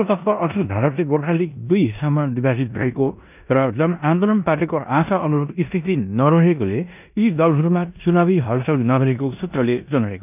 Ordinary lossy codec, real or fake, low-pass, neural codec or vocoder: none; fake; 3.6 kHz; codec, 16 kHz in and 24 kHz out, 0.9 kbps, LongCat-Audio-Codec, four codebook decoder